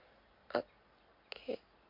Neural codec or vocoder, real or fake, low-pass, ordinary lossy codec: none; real; 5.4 kHz; MP3, 32 kbps